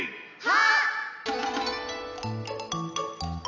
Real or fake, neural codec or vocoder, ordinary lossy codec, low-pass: real; none; none; 7.2 kHz